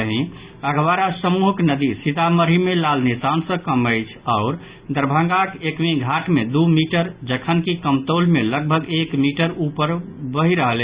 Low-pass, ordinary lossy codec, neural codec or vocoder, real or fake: 3.6 kHz; Opus, 64 kbps; none; real